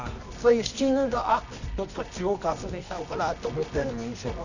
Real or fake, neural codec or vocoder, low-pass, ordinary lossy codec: fake; codec, 24 kHz, 0.9 kbps, WavTokenizer, medium music audio release; 7.2 kHz; none